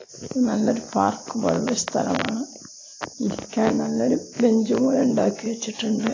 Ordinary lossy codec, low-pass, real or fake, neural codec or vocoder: AAC, 32 kbps; 7.2 kHz; real; none